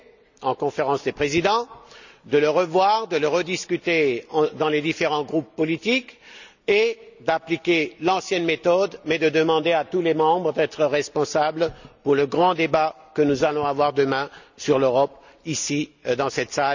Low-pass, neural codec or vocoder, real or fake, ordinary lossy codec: 7.2 kHz; none; real; none